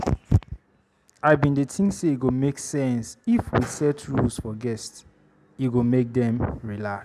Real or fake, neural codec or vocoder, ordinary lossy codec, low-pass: real; none; none; 14.4 kHz